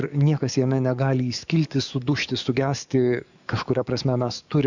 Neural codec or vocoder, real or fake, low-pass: codec, 44.1 kHz, 7.8 kbps, DAC; fake; 7.2 kHz